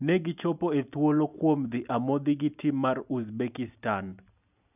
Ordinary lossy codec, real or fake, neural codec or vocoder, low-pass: none; real; none; 3.6 kHz